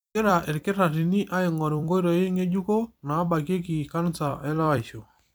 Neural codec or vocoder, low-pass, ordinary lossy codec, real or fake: vocoder, 44.1 kHz, 128 mel bands every 256 samples, BigVGAN v2; none; none; fake